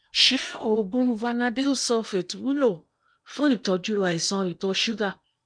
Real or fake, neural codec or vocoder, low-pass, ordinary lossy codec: fake; codec, 16 kHz in and 24 kHz out, 0.8 kbps, FocalCodec, streaming, 65536 codes; 9.9 kHz; none